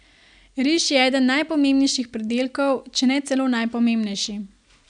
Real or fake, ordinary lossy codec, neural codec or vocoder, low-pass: real; none; none; 9.9 kHz